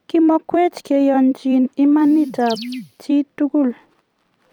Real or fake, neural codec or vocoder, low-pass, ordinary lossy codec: fake; vocoder, 44.1 kHz, 128 mel bands every 256 samples, BigVGAN v2; 19.8 kHz; none